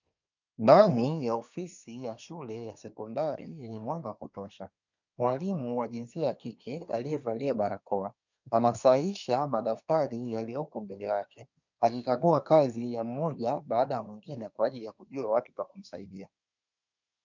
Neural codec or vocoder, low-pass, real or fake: codec, 24 kHz, 1 kbps, SNAC; 7.2 kHz; fake